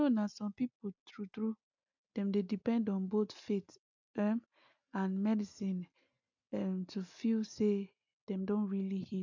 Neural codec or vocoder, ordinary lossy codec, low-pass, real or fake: none; none; 7.2 kHz; real